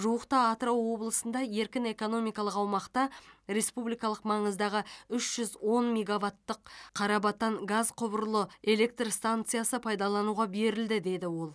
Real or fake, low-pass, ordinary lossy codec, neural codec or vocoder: real; none; none; none